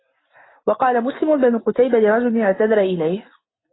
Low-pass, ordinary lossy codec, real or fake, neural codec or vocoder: 7.2 kHz; AAC, 16 kbps; real; none